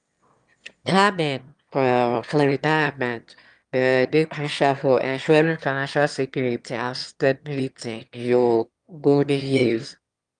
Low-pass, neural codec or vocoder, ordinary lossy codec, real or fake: 9.9 kHz; autoencoder, 22.05 kHz, a latent of 192 numbers a frame, VITS, trained on one speaker; Opus, 32 kbps; fake